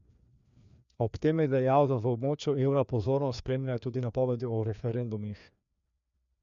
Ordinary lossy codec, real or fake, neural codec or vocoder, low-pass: none; fake; codec, 16 kHz, 2 kbps, FreqCodec, larger model; 7.2 kHz